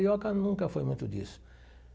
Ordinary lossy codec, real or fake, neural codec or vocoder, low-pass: none; real; none; none